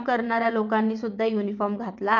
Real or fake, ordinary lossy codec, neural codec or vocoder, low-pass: fake; none; vocoder, 22.05 kHz, 80 mel bands, WaveNeXt; 7.2 kHz